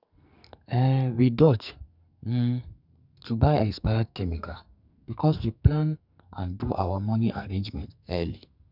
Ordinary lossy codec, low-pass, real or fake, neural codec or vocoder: none; 5.4 kHz; fake; codec, 44.1 kHz, 2.6 kbps, SNAC